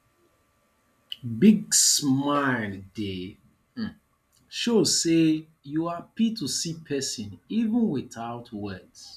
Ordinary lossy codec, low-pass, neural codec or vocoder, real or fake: none; 14.4 kHz; none; real